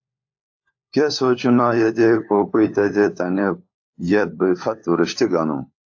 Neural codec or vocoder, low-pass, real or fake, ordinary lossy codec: codec, 16 kHz, 4 kbps, FunCodec, trained on LibriTTS, 50 frames a second; 7.2 kHz; fake; AAC, 48 kbps